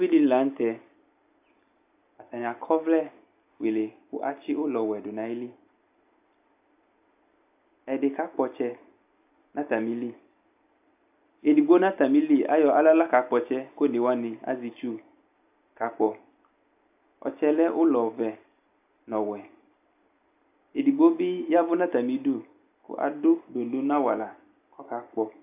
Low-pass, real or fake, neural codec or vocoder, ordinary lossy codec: 3.6 kHz; real; none; AAC, 32 kbps